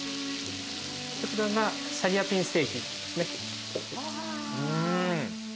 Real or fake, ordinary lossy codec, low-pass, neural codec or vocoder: real; none; none; none